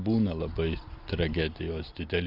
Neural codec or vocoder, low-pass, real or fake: none; 5.4 kHz; real